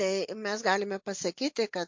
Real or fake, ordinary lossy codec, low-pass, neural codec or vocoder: real; MP3, 48 kbps; 7.2 kHz; none